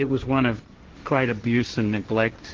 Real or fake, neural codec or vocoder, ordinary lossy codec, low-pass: fake; codec, 16 kHz, 1.1 kbps, Voila-Tokenizer; Opus, 16 kbps; 7.2 kHz